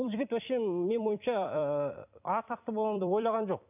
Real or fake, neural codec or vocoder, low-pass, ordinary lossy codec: fake; vocoder, 44.1 kHz, 128 mel bands every 256 samples, BigVGAN v2; 3.6 kHz; none